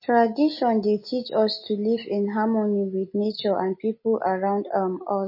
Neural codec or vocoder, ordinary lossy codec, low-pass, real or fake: none; MP3, 24 kbps; 5.4 kHz; real